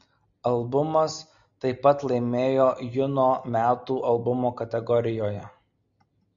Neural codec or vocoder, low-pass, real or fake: none; 7.2 kHz; real